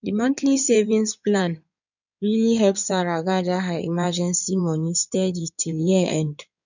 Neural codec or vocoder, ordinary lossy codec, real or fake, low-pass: codec, 16 kHz in and 24 kHz out, 2.2 kbps, FireRedTTS-2 codec; none; fake; 7.2 kHz